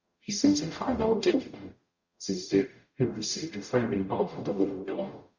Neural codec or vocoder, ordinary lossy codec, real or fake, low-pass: codec, 44.1 kHz, 0.9 kbps, DAC; Opus, 64 kbps; fake; 7.2 kHz